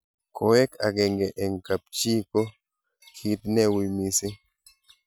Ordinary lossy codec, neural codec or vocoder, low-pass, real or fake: none; none; none; real